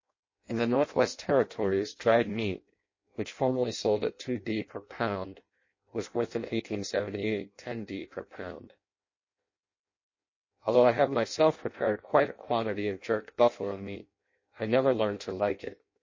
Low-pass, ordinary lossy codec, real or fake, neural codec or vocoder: 7.2 kHz; MP3, 32 kbps; fake; codec, 16 kHz in and 24 kHz out, 0.6 kbps, FireRedTTS-2 codec